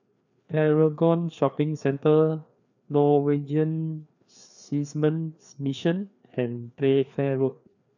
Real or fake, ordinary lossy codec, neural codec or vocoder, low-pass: fake; none; codec, 16 kHz, 2 kbps, FreqCodec, larger model; 7.2 kHz